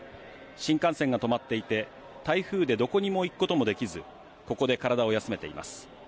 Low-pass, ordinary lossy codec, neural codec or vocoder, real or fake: none; none; none; real